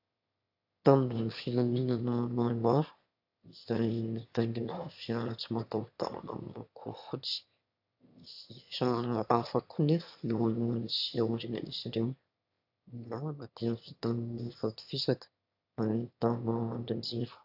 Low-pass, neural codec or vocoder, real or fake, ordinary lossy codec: 5.4 kHz; autoencoder, 22.05 kHz, a latent of 192 numbers a frame, VITS, trained on one speaker; fake; AAC, 48 kbps